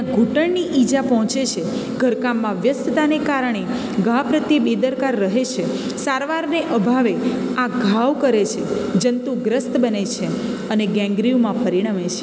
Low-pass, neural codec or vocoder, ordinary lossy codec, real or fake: none; none; none; real